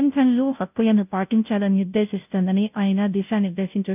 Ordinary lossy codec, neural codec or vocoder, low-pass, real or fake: none; codec, 16 kHz, 0.5 kbps, FunCodec, trained on Chinese and English, 25 frames a second; 3.6 kHz; fake